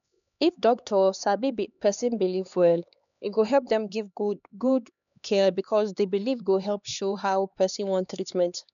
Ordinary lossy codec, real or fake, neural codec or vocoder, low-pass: none; fake; codec, 16 kHz, 2 kbps, X-Codec, HuBERT features, trained on LibriSpeech; 7.2 kHz